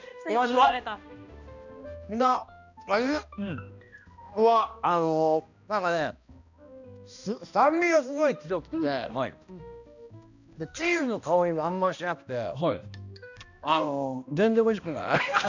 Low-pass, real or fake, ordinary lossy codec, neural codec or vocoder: 7.2 kHz; fake; none; codec, 16 kHz, 1 kbps, X-Codec, HuBERT features, trained on balanced general audio